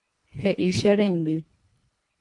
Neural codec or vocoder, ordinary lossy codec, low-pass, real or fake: codec, 24 kHz, 1.5 kbps, HILCodec; MP3, 64 kbps; 10.8 kHz; fake